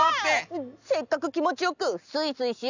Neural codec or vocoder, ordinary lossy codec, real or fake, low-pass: none; none; real; 7.2 kHz